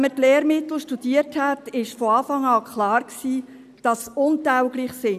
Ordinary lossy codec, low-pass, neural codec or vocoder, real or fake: none; 14.4 kHz; none; real